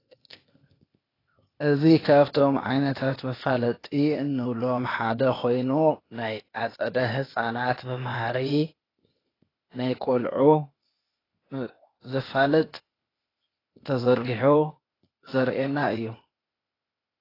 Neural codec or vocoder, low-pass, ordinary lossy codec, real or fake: codec, 16 kHz, 0.8 kbps, ZipCodec; 5.4 kHz; AAC, 24 kbps; fake